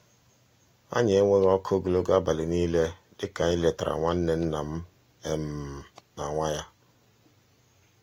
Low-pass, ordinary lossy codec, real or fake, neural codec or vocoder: 19.8 kHz; AAC, 48 kbps; real; none